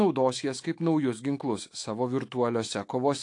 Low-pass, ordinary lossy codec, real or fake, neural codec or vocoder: 10.8 kHz; AAC, 48 kbps; fake; autoencoder, 48 kHz, 128 numbers a frame, DAC-VAE, trained on Japanese speech